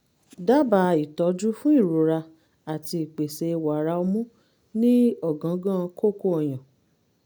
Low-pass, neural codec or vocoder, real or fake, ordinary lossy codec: none; none; real; none